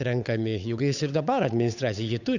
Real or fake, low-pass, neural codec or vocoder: fake; 7.2 kHz; codec, 16 kHz, 8 kbps, FunCodec, trained on Chinese and English, 25 frames a second